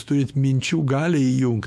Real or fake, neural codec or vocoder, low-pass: fake; autoencoder, 48 kHz, 128 numbers a frame, DAC-VAE, trained on Japanese speech; 14.4 kHz